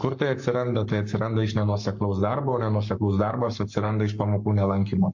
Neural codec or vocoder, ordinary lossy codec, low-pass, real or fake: codec, 44.1 kHz, 7.8 kbps, Pupu-Codec; MP3, 48 kbps; 7.2 kHz; fake